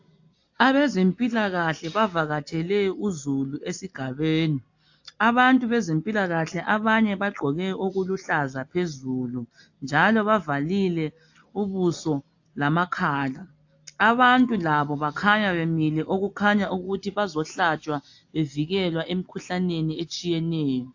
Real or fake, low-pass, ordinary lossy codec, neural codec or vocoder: real; 7.2 kHz; AAC, 48 kbps; none